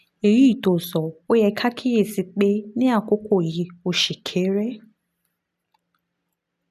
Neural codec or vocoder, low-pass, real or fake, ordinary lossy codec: none; 14.4 kHz; real; none